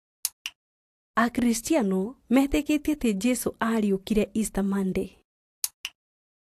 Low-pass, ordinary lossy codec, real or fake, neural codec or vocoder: 14.4 kHz; AAC, 64 kbps; real; none